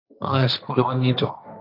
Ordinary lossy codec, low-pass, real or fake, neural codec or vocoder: AAC, 32 kbps; 5.4 kHz; fake; codec, 16 kHz, 1.1 kbps, Voila-Tokenizer